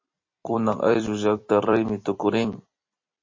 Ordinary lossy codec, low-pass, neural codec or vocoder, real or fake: MP3, 32 kbps; 7.2 kHz; vocoder, 44.1 kHz, 128 mel bands every 256 samples, BigVGAN v2; fake